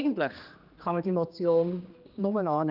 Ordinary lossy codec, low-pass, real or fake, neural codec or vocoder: Opus, 24 kbps; 5.4 kHz; fake; codec, 16 kHz, 2 kbps, X-Codec, HuBERT features, trained on general audio